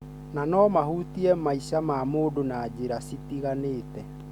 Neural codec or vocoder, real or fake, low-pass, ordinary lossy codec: none; real; 19.8 kHz; Opus, 64 kbps